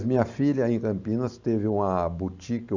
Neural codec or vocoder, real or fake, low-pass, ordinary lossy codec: vocoder, 44.1 kHz, 128 mel bands every 512 samples, BigVGAN v2; fake; 7.2 kHz; Opus, 64 kbps